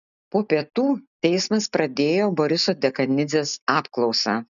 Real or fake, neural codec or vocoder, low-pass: real; none; 7.2 kHz